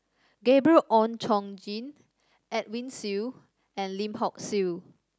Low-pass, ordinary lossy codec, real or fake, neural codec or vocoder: none; none; real; none